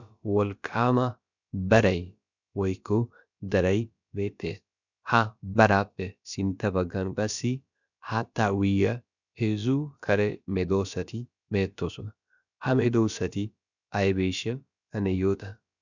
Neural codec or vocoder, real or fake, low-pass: codec, 16 kHz, about 1 kbps, DyCAST, with the encoder's durations; fake; 7.2 kHz